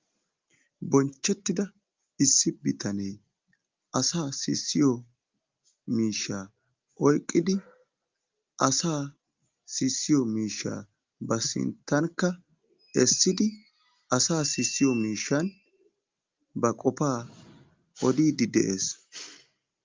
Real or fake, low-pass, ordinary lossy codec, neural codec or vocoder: real; 7.2 kHz; Opus, 32 kbps; none